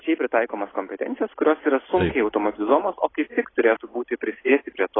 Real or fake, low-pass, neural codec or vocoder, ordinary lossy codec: real; 7.2 kHz; none; AAC, 16 kbps